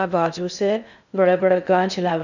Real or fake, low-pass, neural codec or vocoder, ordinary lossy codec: fake; 7.2 kHz; codec, 16 kHz in and 24 kHz out, 0.6 kbps, FocalCodec, streaming, 2048 codes; none